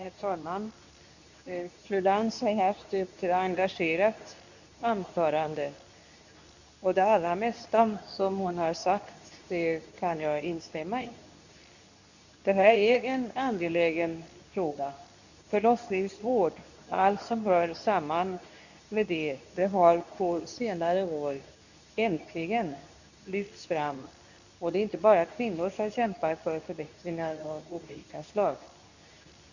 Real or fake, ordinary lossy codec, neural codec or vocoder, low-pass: fake; none; codec, 24 kHz, 0.9 kbps, WavTokenizer, medium speech release version 2; 7.2 kHz